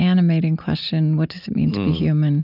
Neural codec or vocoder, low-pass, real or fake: none; 5.4 kHz; real